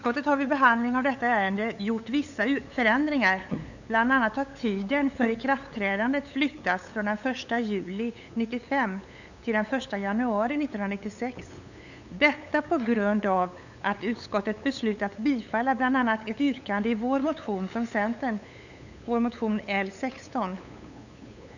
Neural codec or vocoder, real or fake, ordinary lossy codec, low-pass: codec, 16 kHz, 8 kbps, FunCodec, trained on LibriTTS, 25 frames a second; fake; none; 7.2 kHz